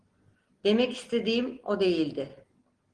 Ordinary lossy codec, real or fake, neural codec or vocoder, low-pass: Opus, 16 kbps; real; none; 9.9 kHz